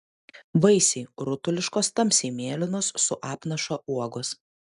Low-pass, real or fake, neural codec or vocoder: 10.8 kHz; real; none